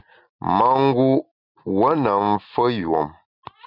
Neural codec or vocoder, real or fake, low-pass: none; real; 5.4 kHz